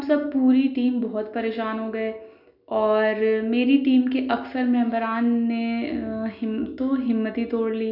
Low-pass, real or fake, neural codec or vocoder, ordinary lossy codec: 5.4 kHz; real; none; none